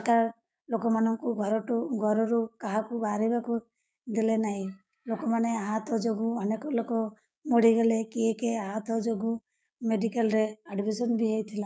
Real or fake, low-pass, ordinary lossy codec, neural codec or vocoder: fake; none; none; codec, 16 kHz, 6 kbps, DAC